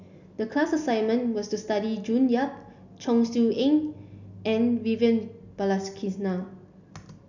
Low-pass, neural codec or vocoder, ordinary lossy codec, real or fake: 7.2 kHz; none; none; real